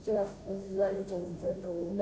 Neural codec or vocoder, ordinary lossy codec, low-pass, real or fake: codec, 16 kHz, 0.5 kbps, FunCodec, trained on Chinese and English, 25 frames a second; none; none; fake